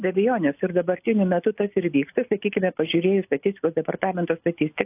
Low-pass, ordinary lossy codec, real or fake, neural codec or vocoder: 3.6 kHz; AAC, 32 kbps; real; none